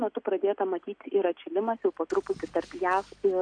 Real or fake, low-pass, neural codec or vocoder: real; 9.9 kHz; none